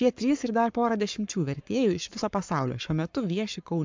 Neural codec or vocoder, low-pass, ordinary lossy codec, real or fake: codec, 44.1 kHz, 7.8 kbps, Pupu-Codec; 7.2 kHz; MP3, 64 kbps; fake